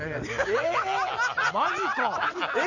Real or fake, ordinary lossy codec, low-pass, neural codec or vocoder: real; none; 7.2 kHz; none